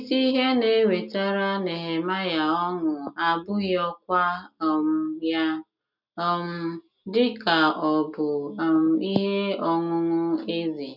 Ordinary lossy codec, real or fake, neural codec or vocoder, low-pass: none; real; none; 5.4 kHz